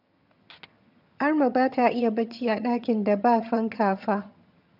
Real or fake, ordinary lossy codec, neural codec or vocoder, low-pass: fake; none; vocoder, 22.05 kHz, 80 mel bands, HiFi-GAN; 5.4 kHz